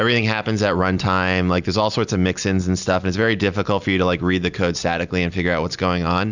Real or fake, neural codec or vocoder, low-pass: real; none; 7.2 kHz